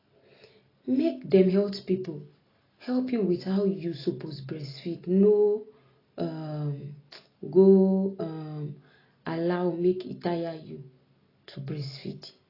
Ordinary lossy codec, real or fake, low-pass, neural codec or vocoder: AAC, 24 kbps; real; 5.4 kHz; none